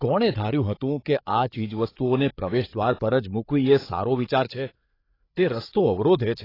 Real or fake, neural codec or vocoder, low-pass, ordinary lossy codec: fake; codec, 16 kHz, 8 kbps, FreqCodec, larger model; 5.4 kHz; AAC, 24 kbps